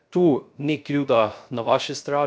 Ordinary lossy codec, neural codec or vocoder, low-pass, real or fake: none; codec, 16 kHz, 0.3 kbps, FocalCodec; none; fake